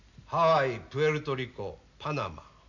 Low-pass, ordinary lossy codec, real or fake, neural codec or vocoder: 7.2 kHz; none; real; none